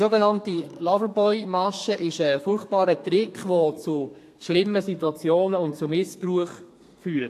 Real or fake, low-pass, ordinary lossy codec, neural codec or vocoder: fake; 14.4 kHz; AAC, 64 kbps; codec, 32 kHz, 1.9 kbps, SNAC